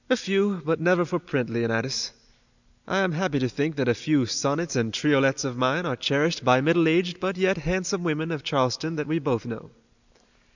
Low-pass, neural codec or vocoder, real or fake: 7.2 kHz; none; real